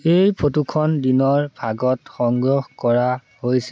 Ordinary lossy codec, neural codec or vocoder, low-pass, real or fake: none; none; none; real